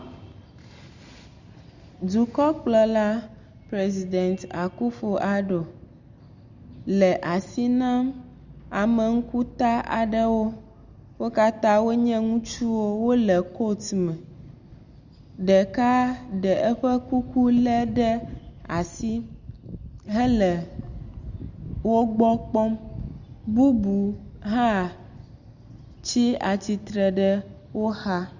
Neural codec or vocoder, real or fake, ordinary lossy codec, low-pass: none; real; Opus, 64 kbps; 7.2 kHz